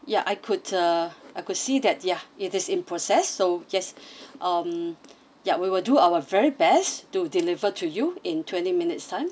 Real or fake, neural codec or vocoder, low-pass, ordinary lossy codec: real; none; none; none